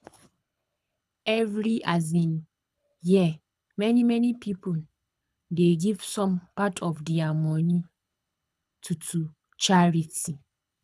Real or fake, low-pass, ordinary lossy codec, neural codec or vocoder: fake; none; none; codec, 24 kHz, 6 kbps, HILCodec